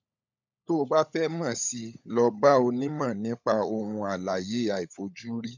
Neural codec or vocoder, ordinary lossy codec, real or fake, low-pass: codec, 16 kHz, 16 kbps, FunCodec, trained on LibriTTS, 50 frames a second; none; fake; 7.2 kHz